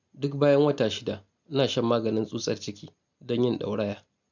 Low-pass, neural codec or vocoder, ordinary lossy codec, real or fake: 7.2 kHz; none; none; real